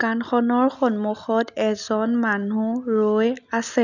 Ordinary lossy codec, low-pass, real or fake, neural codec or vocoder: none; 7.2 kHz; real; none